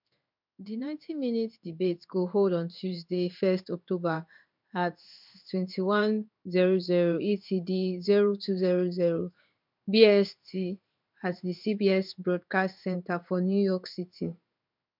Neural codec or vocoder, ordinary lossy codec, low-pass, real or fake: codec, 16 kHz in and 24 kHz out, 1 kbps, XY-Tokenizer; MP3, 48 kbps; 5.4 kHz; fake